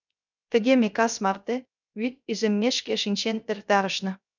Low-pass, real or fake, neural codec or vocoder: 7.2 kHz; fake; codec, 16 kHz, 0.3 kbps, FocalCodec